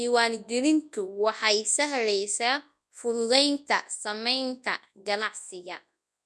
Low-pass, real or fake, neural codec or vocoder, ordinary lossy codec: none; fake; codec, 24 kHz, 0.9 kbps, WavTokenizer, large speech release; none